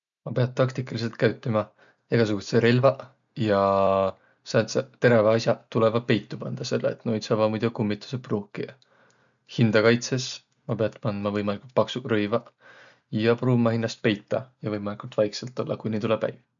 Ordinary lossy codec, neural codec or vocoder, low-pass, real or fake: none; none; 7.2 kHz; real